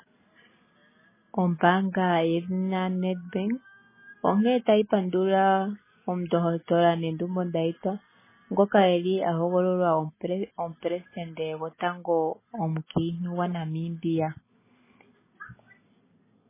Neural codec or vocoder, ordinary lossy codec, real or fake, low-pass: none; MP3, 16 kbps; real; 3.6 kHz